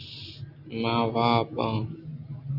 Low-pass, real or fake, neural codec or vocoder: 5.4 kHz; real; none